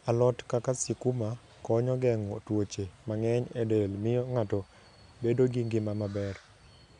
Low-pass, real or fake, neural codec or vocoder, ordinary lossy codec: 10.8 kHz; real; none; none